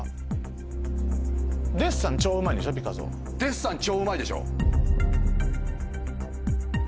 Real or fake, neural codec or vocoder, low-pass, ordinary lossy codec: real; none; none; none